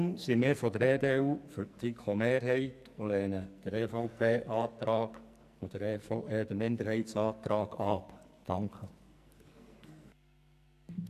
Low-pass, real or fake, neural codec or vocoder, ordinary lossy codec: 14.4 kHz; fake; codec, 44.1 kHz, 2.6 kbps, SNAC; none